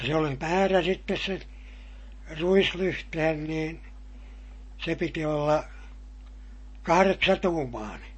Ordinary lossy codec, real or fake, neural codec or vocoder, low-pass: MP3, 32 kbps; real; none; 9.9 kHz